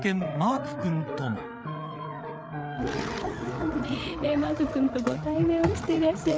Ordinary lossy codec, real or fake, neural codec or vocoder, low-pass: none; fake; codec, 16 kHz, 8 kbps, FreqCodec, larger model; none